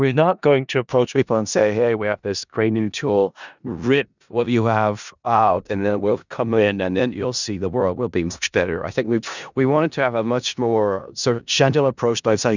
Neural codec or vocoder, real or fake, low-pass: codec, 16 kHz in and 24 kHz out, 0.4 kbps, LongCat-Audio-Codec, four codebook decoder; fake; 7.2 kHz